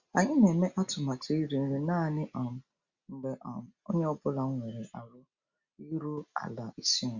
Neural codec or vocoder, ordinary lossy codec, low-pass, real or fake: none; Opus, 64 kbps; 7.2 kHz; real